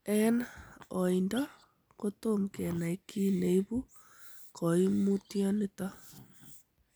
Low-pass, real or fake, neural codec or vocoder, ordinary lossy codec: none; real; none; none